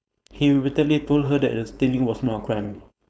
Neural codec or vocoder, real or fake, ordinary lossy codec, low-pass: codec, 16 kHz, 4.8 kbps, FACodec; fake; none; none